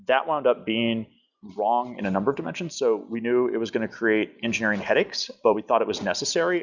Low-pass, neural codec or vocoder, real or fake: 7.2 kHz; none; real